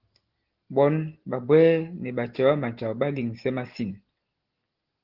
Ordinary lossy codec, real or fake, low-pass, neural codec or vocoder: Opus, 16 kbps; real; 5.4 kHz; none